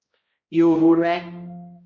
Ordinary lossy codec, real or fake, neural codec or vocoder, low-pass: MP3, 32 kbps; fake; codec, 16 kHz, 0.5 kbps, X-Codec, HuBERT features, trained on balanced general audio; 7.2 kHz